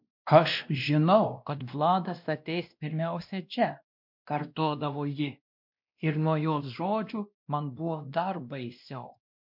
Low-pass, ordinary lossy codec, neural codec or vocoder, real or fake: 5.4 kHz; MP3, 48 kbps; codec, 16 kHz, 1 kbps, X-Codec, WavLM features, trained on Multilingual LibriSpeech; fake